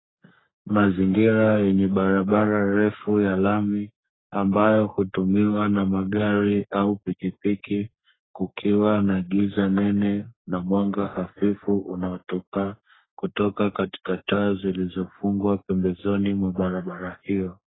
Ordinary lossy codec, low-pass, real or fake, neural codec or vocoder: AAC, 16 kbps; 7.2 kHz; fake; codec, 44.1 kHz, 3.4 kbps, Pupu-Codec